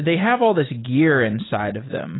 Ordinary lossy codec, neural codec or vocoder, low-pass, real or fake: AAC, 16 kbps; none; 7.2 kHz; real